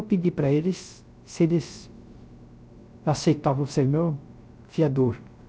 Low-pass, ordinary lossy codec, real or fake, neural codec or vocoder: none; none; fake; codec, 16 kHz, 0.3 kbps, FocalCodec